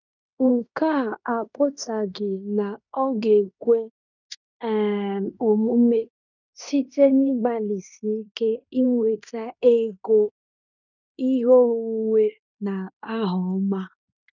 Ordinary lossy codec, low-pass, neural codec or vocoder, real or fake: none; 7.2 kHz; codec, 16 kHz in and 24 kHz out, 0.9 kbps, LongCat-Audio-Codec, fine tuned four codebook decoder; fake